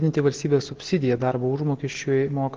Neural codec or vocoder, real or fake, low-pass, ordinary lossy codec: none; real; 7.2 kHz; Opus, 16 kbps